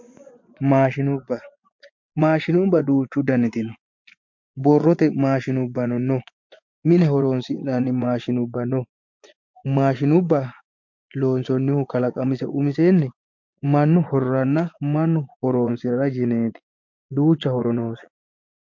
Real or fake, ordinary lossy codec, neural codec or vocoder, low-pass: fake; MP3, 48 kbps; vocoder, 24 kHz, 100 mel bands, Vocos; 7.2 kHz